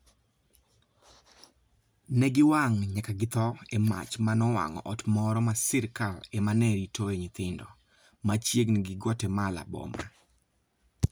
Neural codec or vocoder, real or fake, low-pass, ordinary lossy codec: none; real; none; none